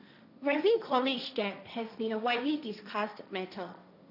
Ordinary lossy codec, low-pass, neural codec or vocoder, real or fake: none; 5.4 kHz; codec, 16 kHz, 1.1 kbps, Voila-Tokenizer; fake